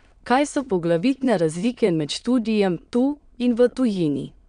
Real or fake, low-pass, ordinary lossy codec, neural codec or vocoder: fake; 9.9 kHz; none; autoencoder, 22.05 kHz, a latent of 192 numbers a frame, VITS, trained on many speakers